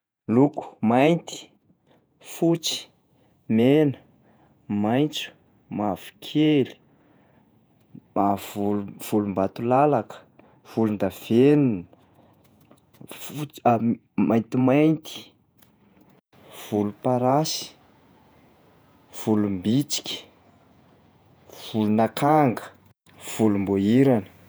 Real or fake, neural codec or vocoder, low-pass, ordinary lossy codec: fake; vocoder, 48 kHz, 128 mel bands, Vocos; none; none